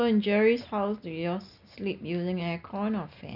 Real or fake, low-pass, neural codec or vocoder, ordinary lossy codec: real; 5.4 kHz; none; none